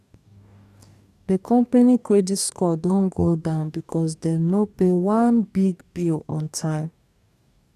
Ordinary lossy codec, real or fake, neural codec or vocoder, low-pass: none; fake; codec, 44.1 kHz, 2.6 kbps, DAC; 14.4 kHz